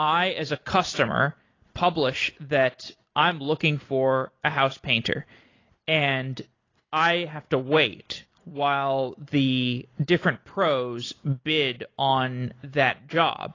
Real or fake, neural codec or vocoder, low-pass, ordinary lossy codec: real; none; 7.2 kHz; AAC, 32 kbps